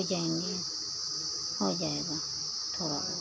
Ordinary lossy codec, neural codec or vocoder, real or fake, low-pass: none; none; real; none